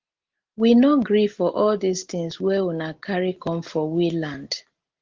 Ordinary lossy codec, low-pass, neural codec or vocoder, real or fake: Opus, 16 kbps; 7.2 kHz; none; real